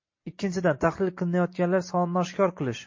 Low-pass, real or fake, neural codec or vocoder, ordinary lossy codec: 7.2 kHz; real; none; MP3, 32 kbps